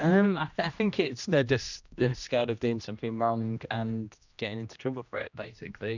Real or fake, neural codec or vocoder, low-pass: fake; codec, 16 kHz, 1 kbps, X-Codec, HuBERT features, trained on general audio; 7.2 kHz